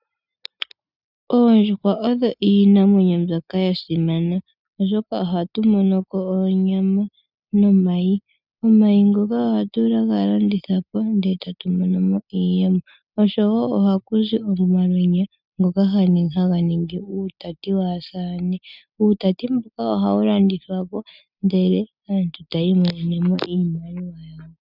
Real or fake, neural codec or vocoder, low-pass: real; none; 5.4 kHz